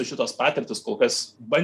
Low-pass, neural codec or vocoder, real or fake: 14.4 kHz; none; real